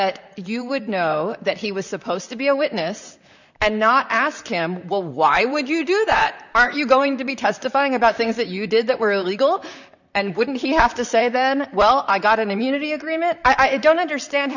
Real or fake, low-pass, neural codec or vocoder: fake; 7.2 kHz; vocoder, 44.1 kHz, 128 mel bands, Pupu-Vocoder